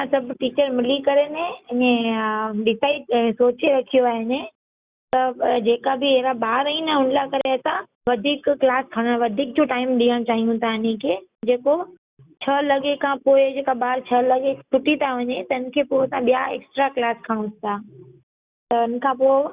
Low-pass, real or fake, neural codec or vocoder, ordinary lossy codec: 3.6 kHz; real; none; Opus, 64 kbps